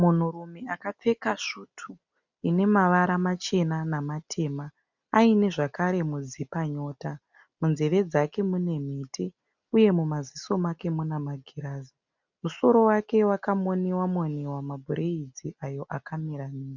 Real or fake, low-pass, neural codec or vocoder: real; 7.2 kHz; none